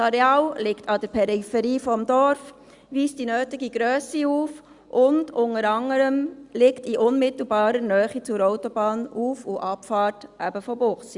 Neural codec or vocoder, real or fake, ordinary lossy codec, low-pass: none; real; Opus, 64 kbps; 10.8 kHz